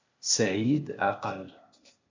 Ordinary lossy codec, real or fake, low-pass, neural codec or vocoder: MP3, 64 kbps; fake; 7.2 kHz; codec, 16 kHz, 0.8 kbps, ZipCodec